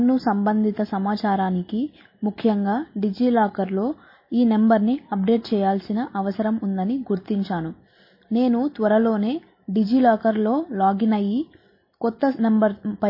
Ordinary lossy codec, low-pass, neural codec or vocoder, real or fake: MP3, 24 kbps; 5.4 kHz; none; real